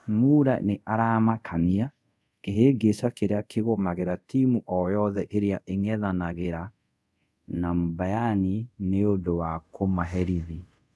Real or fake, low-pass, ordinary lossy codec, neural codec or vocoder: fake; none; none; codec, 24 kHz, 0.5 kbps, DualCodec